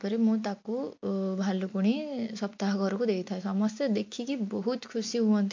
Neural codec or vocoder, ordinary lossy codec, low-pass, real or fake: none; MP3, 48 kbps; 7.2 kHz; real